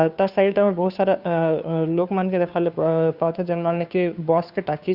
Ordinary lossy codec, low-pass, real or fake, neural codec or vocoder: Opus, 64 kbps; 5.4 kHz; fake; codec, 16 kHz, 2 kbps, FunCodec, trained on Chinese and English, 25 frames a second